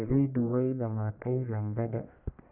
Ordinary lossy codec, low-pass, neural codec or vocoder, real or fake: none; 3.6 kHz; codec, 44.1 kHz, 1.7 kbps, Pupu-Codec; fake